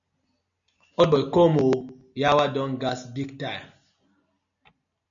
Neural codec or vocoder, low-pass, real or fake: none; 7.2 kHz; real